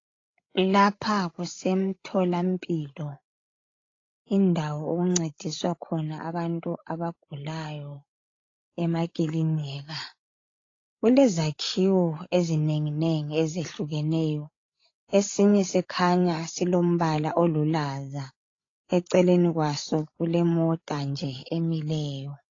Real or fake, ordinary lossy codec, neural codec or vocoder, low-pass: fake; AAC, 32 kbps; codec, 16 kHz, 16 kbps, FreqCodec, larger model; 7.2 kHz